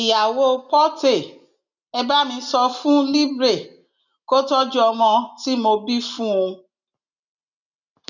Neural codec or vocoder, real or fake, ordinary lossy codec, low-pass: none; real; none; 7.2 kHz